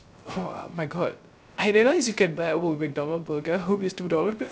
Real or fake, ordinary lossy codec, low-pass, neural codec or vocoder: fake; none; none; codec, 16 kHz, 0.3 kbps, FocalCodec